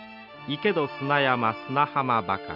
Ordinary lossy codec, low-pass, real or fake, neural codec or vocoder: none; 5.4 kHz; real; none